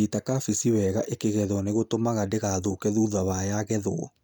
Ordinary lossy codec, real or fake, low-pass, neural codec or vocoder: none; real; none; none